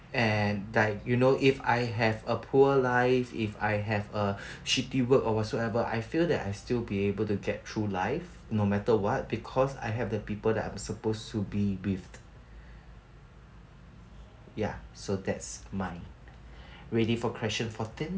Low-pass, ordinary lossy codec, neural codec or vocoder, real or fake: none; none; none; real